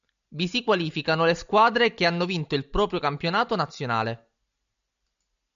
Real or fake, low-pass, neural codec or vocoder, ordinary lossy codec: real; 7.2 kHz; none; AAC, 96 kbps